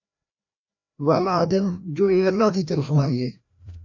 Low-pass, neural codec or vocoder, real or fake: 7.2 kHz; codec, 16 kHz, 1 kbps, FreqCodec, larger model; fake